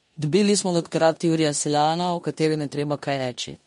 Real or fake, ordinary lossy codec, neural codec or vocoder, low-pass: fake; MP3, 48 kbps; codec, 16 kHz in and 24 kHz out, 0.9 kbps, LongCat-Audio-Codec, four codebook decoder; 10.8 kHz